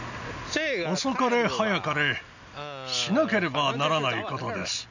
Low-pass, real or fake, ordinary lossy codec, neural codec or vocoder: 7.2 kHz; real; none; none